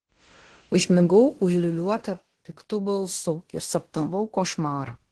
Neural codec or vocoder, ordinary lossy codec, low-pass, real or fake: codec, 16 kHz in and 24 kHz out, 0.9 kbps, LongCat-Audio-Codec, fine tuned four codebook decoder; Opus, 16 kbps; 10.8 kHz; fake